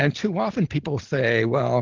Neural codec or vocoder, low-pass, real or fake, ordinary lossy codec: none; 7.2 kHz; real; Opus, 16 kbps